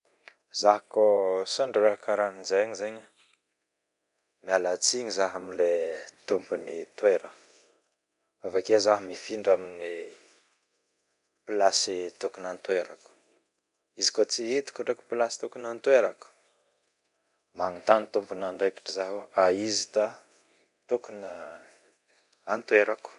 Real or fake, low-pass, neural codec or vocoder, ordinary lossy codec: fake; 10.8 kHz; codec, 24 kHz, 0.9 kbps, DualCodec; none